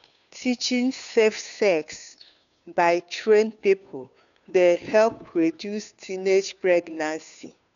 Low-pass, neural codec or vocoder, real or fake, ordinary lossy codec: 7.2 kHz; codec, 16 kHz, 2 kbps, FunCodec, trained on Chinese and English, 25 frames a second; fake; none